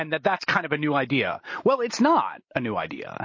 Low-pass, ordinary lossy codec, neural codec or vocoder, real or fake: 7.2 kHz; MP3, 32 kbps; none; real